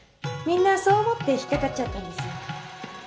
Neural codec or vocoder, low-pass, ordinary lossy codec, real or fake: none; none; none; real